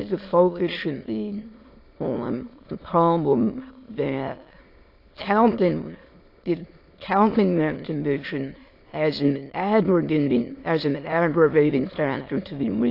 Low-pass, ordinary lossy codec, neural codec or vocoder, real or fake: 5.4 kHz; AAC, 32 kbps; autoencoder, 22.05 kHz, a latent of 192 numbers a frame, VITS, trained on many speakers; fake